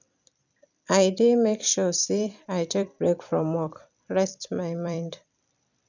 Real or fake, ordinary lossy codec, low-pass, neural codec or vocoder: fake; none; 7.2 kHz; vocoder, 44.1 kHz, 128 mel bands every 256 samples, BigVGAN v2